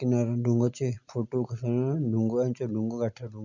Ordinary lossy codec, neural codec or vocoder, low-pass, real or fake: none; none; 7.2 kHz; real